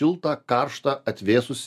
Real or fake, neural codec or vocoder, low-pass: real; none; 14.4 kHz